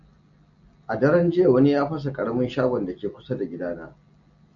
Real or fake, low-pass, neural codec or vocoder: real; 7.2 kHz; none